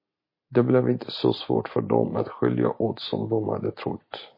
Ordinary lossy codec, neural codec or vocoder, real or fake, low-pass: MP3, 24 kbps; none; real; 5.4 kHz